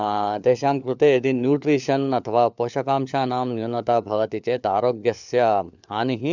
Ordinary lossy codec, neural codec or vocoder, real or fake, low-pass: none; codec, 16 kHz, 4 kbps, FunCodec, trained on LibriTTS, 50 frames a second; fake; 7.2 kHz